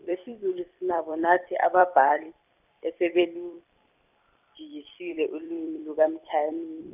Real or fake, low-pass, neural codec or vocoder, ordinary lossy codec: fake; 3.6 kHz; vocoder, 44.1 kHz, 128 mel bands every 256 samples, BigVGAN v2; none